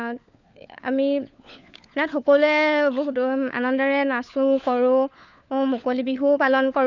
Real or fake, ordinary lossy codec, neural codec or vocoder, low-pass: fake; none; codec, 16 kHz, 4 kbps, FunCodec, trained on LibriTTS, 50 frames a second; 7.2 kHz